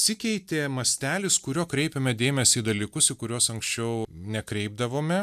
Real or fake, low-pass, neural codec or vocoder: real; 14.4 kHz; none